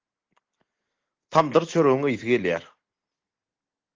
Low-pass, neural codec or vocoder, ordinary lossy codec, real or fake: 7.2 kHz; none; Opus, 16 kbps; real